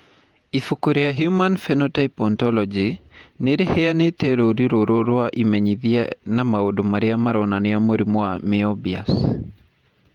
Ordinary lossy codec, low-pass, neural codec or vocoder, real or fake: Opus, 32 kbps; 19.8 kHz; vocoder, 48 kHz, 128 mel bands, Vocos; fake